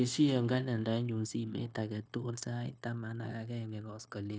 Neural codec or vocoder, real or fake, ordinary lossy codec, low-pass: codec, 16 kHz, 0.9 kbps, LongCat-Audio-Codec; fake; none; none